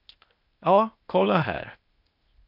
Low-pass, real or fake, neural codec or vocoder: 5.4 kHz; fake; codec, 16 kHz, 0.8 kbps, ZipCodec